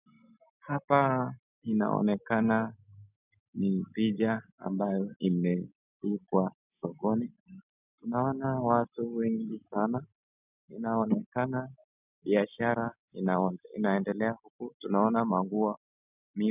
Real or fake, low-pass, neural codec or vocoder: real; 3.6 kHz; none